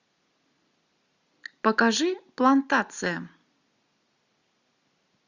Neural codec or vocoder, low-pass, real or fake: none; 7.2 kHz; real